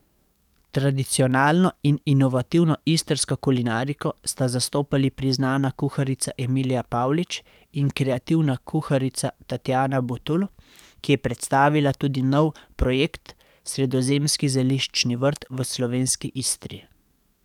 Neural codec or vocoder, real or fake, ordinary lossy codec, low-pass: codec, 44.1 kHz, 7.8 kbps, DAC; fake; none; 19.8 kHz